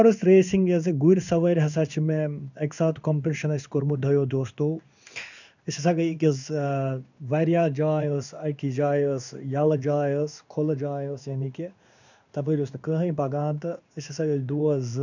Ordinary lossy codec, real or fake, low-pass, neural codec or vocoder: none; fake; 7.2 kHz; codec, 16 kHz in and 24 kHz out, 1 kbps, XY-Tokenizer